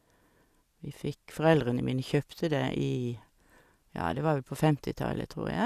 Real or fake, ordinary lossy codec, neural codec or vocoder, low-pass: real; Opus, 64 kbps; none; 14.4 kHz